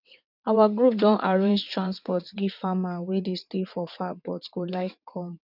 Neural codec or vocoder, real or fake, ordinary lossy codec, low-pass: vocoder, 22.05 kHz, 80 mel bands, WaveNeXt; fake; none; 5.4 kHz